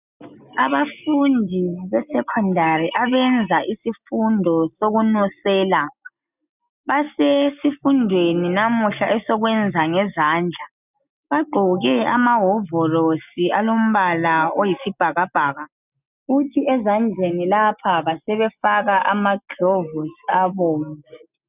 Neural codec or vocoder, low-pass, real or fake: none; 3.6 kHz; real